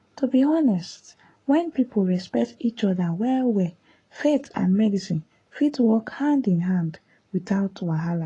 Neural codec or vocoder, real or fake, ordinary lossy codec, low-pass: codec, 44.1 kHz, 7.8 kbps, Pupu-Codec; fake; AAC, 32 kbps; 10.8 kHz